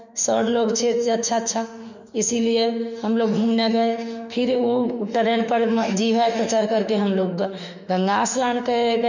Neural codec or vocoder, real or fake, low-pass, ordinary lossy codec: autoencoder, 48 kHz, 32 numbers a frame, DAC-VAE, trained on Japanese speech; fake; 7.2 kHz; none